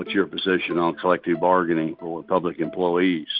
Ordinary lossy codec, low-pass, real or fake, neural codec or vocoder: Opus, 64 kbps; 5.4 kHz; real; none